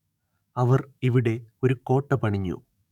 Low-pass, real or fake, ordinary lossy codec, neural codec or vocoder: 19.8 kHz; fake; none; codec, 44.1 kHz, 7.8 kbps, DAC